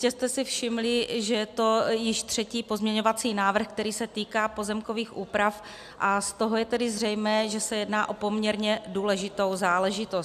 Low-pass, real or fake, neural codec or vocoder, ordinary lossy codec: 14.4 kHz; real; none; AAC, 96 kbps